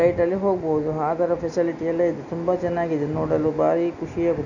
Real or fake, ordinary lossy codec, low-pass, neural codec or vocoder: real; none; 7.2 kHz; none